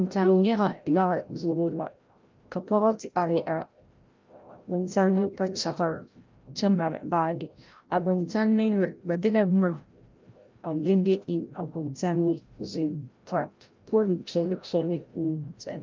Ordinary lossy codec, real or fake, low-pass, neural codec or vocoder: Opus, 32 kbps; fake; 7.2 kHz; codec, 16 kHz, 0.5 kbps, FreqCodec, larger model